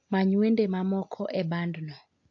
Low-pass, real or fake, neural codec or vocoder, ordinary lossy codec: 7.2 kHz; real; none; AAC, 48 kbps